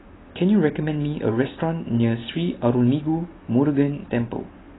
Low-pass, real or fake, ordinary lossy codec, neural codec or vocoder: 7.2 kHz; real; AAC, 16 kbps; none